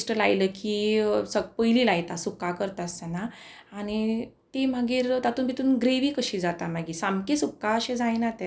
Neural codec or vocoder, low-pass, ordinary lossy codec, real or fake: none; none; none; real